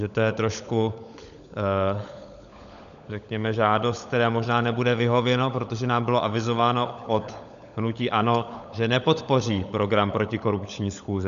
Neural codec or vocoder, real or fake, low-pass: codec, 16 kHz, 16 kbps, FunCodec, trained on LibriTTS, 50 frames a second; fake; 7.2 kHz